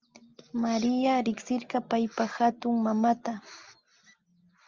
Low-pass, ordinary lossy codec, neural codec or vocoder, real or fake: 7.2 kHz; Opus, 32 kbps; none; real